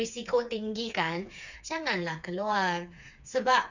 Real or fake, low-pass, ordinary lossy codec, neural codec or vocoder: fake; 7.2 kHz; none; codec, 16 kHz, 4 kbps, FreqCodec, smaller model